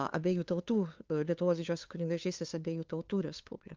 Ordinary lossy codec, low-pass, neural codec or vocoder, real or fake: Opus, 32 kbps; 7.2 kHz; codec, 16 kHz, 2 kbps, FunCodec, trained on LibriTTS, 25 frames a second; fake